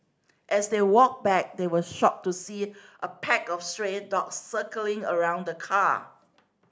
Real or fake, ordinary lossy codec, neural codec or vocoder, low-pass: real; none; none; none